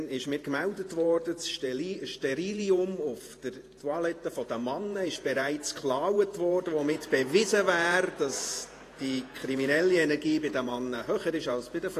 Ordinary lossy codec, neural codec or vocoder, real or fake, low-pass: AAC, 48 kbps; vocoder, 48 kHz, 128 mel bands, Vocos; fake; 14.4 kHz